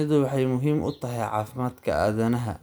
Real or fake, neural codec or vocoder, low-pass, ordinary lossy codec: real; none; none; none